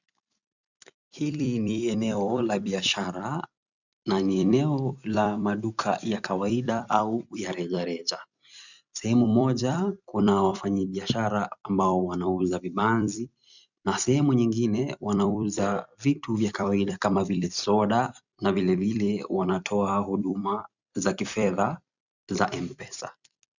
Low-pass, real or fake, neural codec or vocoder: 7.2 kHz; fake; vocoder, 44.1 kHz, 128 mel bands every 256 samples, BigVGAN v2